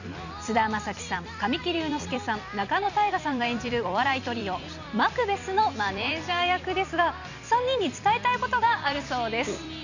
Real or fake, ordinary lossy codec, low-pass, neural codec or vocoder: fake; none; 7.2 kHz; vocoder, 44.1 kHz, 128 mel bands every 256 samples, BigVGAN v2